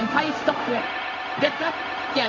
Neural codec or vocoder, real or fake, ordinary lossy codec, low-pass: codec, 16 kHz, 0.4 kbps, LongCat-Audio-Codec; fake; MP3, 48 kbps; 7.2 kHz